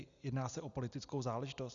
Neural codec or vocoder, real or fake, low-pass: none; real; 7.2 kHz